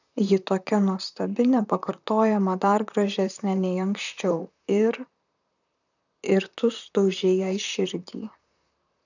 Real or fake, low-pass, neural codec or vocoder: fake; 7.2 kHz; vocoder, 44.1 kHz, 128 mel bands, Pupu-Vocoder